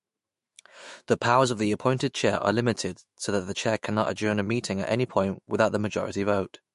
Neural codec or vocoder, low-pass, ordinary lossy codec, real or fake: autoencoder, 48 kHz, 128 numbers a frame, DAC-VAE, trained on Japanese speech; 14.4 kHz; MP3, 48 kbps; fake